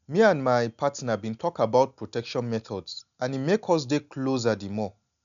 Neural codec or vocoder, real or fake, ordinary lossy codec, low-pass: none; real; none; 7.2 kHz